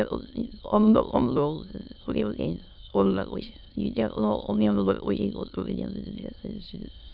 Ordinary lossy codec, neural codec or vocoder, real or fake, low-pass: AAC, 48 kbps; autoencoder, 22.05 kHz, a latent of 192 numbers a frame, VITS, trained on many speakers; fake; 5.4 kHz